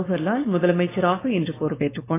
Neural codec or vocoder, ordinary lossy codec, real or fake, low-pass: codec, 16 kHz, 4.8 kbps, FACodec; AAC, 16 kbps; fake; 3.6 kHz